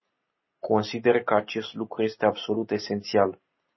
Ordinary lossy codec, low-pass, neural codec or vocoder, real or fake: MP3, 24 kbps; 7.2 kHz; none; real